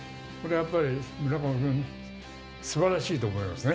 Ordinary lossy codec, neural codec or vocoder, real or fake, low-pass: none; none; real; none